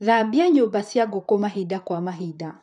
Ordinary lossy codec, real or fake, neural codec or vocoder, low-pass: none; fake; vocoder, 44.1 kHz, 128 mel bands, Pupu-Vocoder; 10.8 kHz